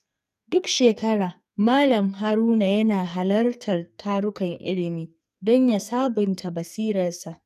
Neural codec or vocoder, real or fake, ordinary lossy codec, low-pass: codec, 44.1 kHz, 2.6 kbps, SNAC; fake; none; 14.4 kHz